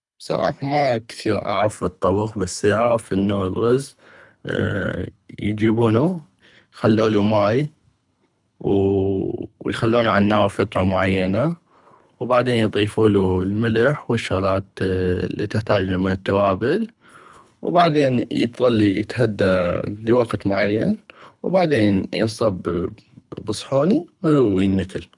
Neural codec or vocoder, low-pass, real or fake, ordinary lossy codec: codec, 24 kHz, 3 kbps, HILCodec; 10.8 kHz; fake; none